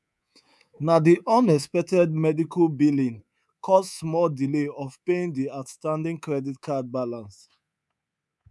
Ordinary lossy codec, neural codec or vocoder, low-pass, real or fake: none; codec, 24 kHz, 3.1 kbps, DualCodec; none; fake